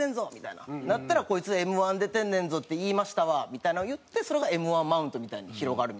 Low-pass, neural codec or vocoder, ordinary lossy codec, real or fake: none; none; none; real